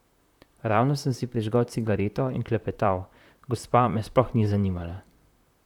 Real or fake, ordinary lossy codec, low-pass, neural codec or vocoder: fake; none; 19.8 kHz; vocoder, 44.1 kHz, 128 mel bands, Pupu-Vocoder